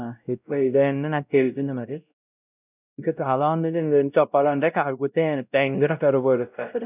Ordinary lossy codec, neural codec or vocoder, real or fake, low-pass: none; codec, 16 kHz, 0.5 kbps, X-Codec, WavLM features, trained on Multilingual LibriSpeech; fake; 3.6 kHz